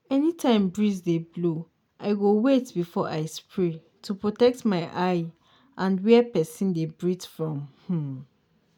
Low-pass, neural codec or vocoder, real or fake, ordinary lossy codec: 19.8 kHz; none; real; none